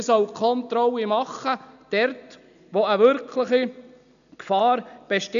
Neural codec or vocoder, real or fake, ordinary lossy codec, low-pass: none; real; none; 7.2 kHz